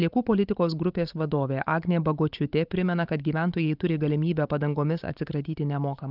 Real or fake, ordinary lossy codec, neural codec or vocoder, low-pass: fake; Opus, 24 kbps; vocoder, 44.1 kHz, 128 mel bands every 512 samples, BigVGAN v2; 5.4 kHz